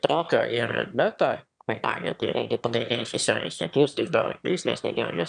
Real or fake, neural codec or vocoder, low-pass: fake; autoencoder, 22.05 kHz, a latent of 192 numbers a frame, VITS, trained on one speaker; 9.9 kHz